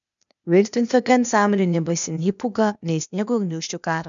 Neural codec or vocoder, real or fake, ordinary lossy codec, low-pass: codec, 16 kHz, 0.8 kbps, ZipCodec; fake; MP3, 96 kbps; 7.2 kHz